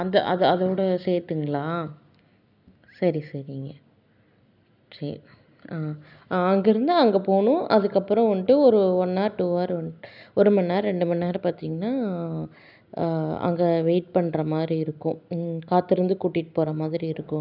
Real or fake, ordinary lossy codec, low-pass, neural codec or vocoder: real; none; 5.4 kHz; none